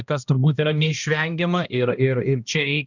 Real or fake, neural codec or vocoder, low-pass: fake; codec, 16 kHz, 1 kbps, X-Codec, HuBERT features, trained on balanced general audio; 7.2 kHz